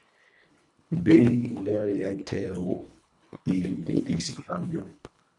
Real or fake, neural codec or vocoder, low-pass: fake; codec, 24 kHz, 1.5 kbps, HILCodec; 10.8 kHz